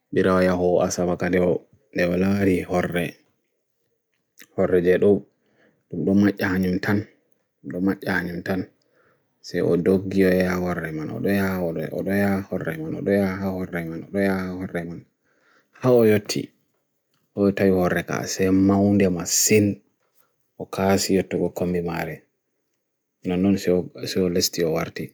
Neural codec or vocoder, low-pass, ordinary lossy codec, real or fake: none; none; none; real